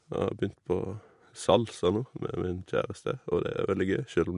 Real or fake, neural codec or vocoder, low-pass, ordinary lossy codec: real; none; 10.8 kHz; MP3, 64 kbps